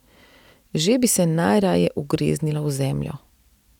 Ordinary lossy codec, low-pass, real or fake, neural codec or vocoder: none; 19.8 kHz; real; none